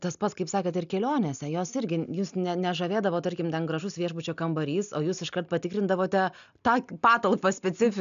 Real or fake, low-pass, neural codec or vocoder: real; 7.2 kHz; none